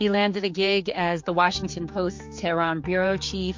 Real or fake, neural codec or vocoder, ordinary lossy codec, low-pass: fake; codec, 16 kHz, 4 kbps, X-Codec, HuBERT features, trained on general audio; MP3, 48 kbps; 7.2 kHz